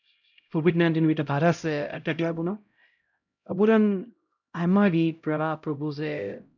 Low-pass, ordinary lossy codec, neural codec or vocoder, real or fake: 7.2 kHz; none; codec, 16 kHz, 0.5 kbps, X-Codec, HuBERT features, trained on LibriSpeech; fake